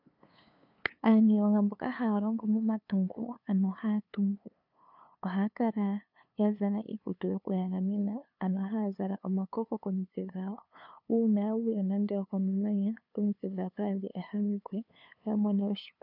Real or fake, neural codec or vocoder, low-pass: fake; codec, 16 kHz, 2 kbps, FunCodec, trained on LibriTTS, 25 frames a second; 5.4 kHz